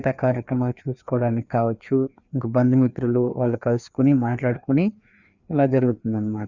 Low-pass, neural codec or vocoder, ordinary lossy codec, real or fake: 7.2 kHz; codec, 16 kHz, 2 kbps, FreqCodec, larger model; Opus, 64 kbps; fake